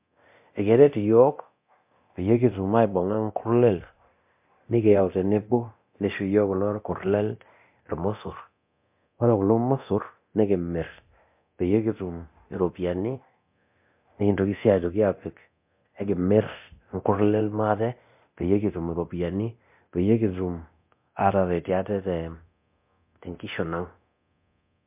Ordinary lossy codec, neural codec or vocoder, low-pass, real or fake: MP3, 32 kbps; codec, 24 kHz, 0.9 kbps, DualCodec; 3.6 kHz; fake